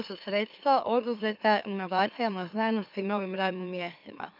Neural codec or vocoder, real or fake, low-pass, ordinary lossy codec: autoencoder, 44.1 kHz, a latent of 192 numbers a frame, MeloTTS; fake; 5.4 kHz; none